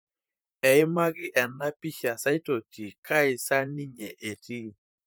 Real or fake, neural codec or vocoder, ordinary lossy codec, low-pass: fake; vocoder, 44.1 kHz, 128 mel bands, Pupu-Vocoder; none; none